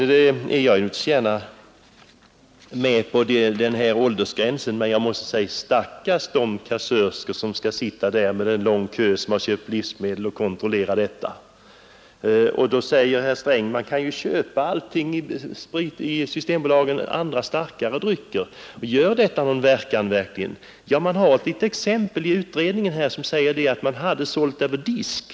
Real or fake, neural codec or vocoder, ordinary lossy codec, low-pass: real; none; none; none